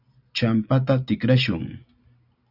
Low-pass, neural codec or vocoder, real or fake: 5.4 kHz; none; real